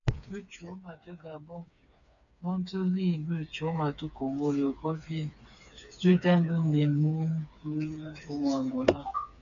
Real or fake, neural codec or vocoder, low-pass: fake; codec, 16 kHz, 4 kbps, FreqCodec, smaller model; 7.2 kHz